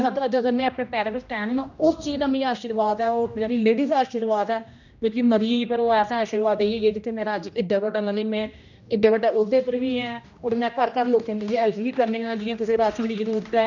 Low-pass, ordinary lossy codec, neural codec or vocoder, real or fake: 7.2 kHz; none; codec, 16 kHz, 1 kbps, X-Codec, HuBERT features, trained on general audio; fake